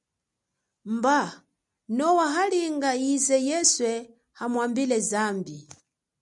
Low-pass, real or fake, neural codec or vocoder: 10.8 kHz; real; none